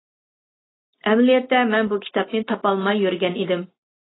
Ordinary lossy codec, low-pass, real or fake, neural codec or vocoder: AAC, 16 kbps; 7.2 kHz; real; none